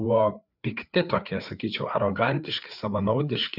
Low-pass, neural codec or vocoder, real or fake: 5.4 kHz; codec, 16 kHz, 4 kbps, FreqCodec, larger model; fake